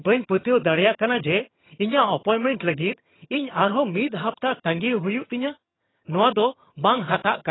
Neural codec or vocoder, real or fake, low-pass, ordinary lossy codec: vocoder, 22.05 kHz, 80 mel bands, HiFi-GAN; fake; 7.2 kHz; AAC, 16 kbps